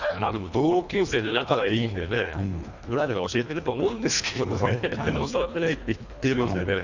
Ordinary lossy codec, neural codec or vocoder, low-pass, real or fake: none; codec, 24 kHz, 1.5 kbps, HILCodec; 7.2 kHz; fake